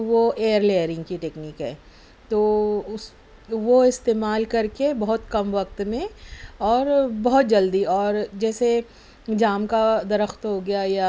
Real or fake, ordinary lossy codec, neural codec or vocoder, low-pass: real; none; none; none